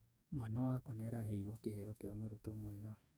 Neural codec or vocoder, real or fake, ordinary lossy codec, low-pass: codec, 44.1 kHz, 2.6 kbps, DAC; fake; none; none